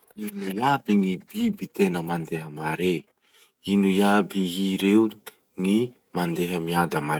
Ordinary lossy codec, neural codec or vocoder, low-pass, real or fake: none; codec, 44.1 kHz, 7.8 kbps, DAC; 19.8 kHz; fake